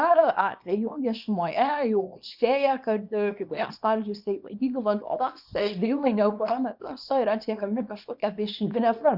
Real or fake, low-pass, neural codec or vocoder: fake; 5.4 kHz; codec, 24 kHz, 0.9 kbps, WavTokenizer, small release